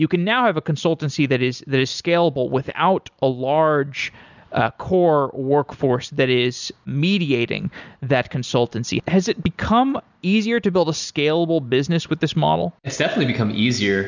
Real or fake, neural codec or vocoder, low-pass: real; none; 7.2 kHz